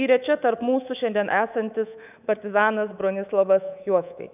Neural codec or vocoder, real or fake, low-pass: codec, 24 kHz, 3.1 kbps, DualCodec; fake; 3.6 kHz